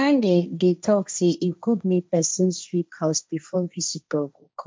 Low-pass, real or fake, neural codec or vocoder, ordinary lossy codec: none; fake; codec, 16 kHz, 1.1 kbps, Voila-Tokenizer; none